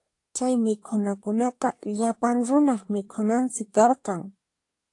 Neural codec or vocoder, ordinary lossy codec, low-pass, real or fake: codec, 24 kHz, 1 kbps, SNAC; AAC, 48 kbps; 10.8 kHz; fake